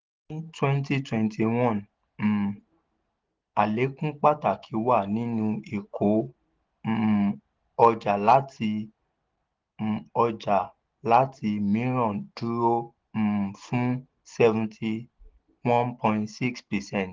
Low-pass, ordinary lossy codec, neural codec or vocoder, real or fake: 7.2 kHz; Opus, 16 kbps; none; real